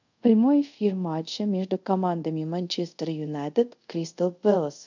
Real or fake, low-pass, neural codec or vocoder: fake; 7.2 kHz; codec, 24 kHz, 0.5 kbps, DualCodec